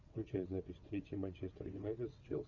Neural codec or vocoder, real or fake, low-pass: vocoder, 22.05 kHz, 80 mel bands, WaveNeXt; fake; 7.2 kHz